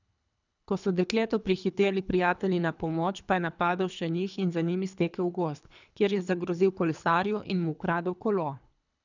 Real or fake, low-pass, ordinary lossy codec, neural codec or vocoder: fake; 7.2 kHz; none; codec, 24 kHz, 3 kbps, HILCodec